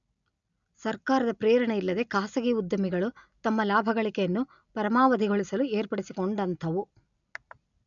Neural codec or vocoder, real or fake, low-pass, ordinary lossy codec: none; real; 7.2 kHz; none